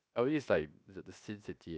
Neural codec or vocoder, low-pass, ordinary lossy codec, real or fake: codec, 16 kHz, 0.7 kbps, FocalCodec; none; none; fake